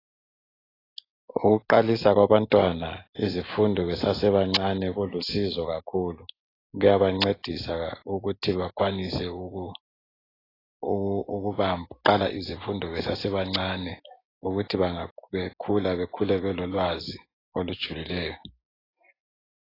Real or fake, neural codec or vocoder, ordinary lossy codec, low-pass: real; none; AAC, 24 kbps; 5.4 kHz